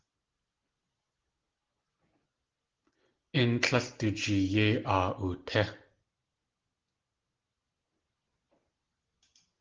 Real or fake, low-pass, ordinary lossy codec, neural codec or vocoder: real; 7.2 kHz; Opus, 16 kbps; none